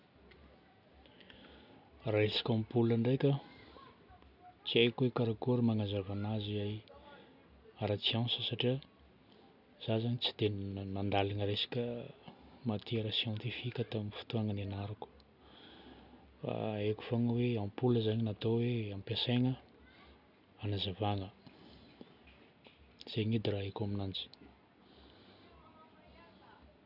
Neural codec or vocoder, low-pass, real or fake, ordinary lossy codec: none; 5.4 kHz; real; none